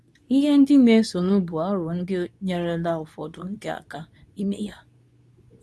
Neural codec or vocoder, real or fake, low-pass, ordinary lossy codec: codec, 24 kHz, 0.9 kbps, WavTokenizer, medium speech release version 2; fake; none; none